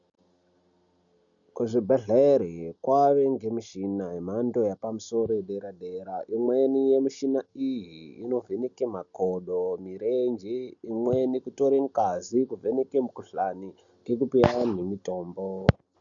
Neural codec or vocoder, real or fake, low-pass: none; real; 7.2 kHz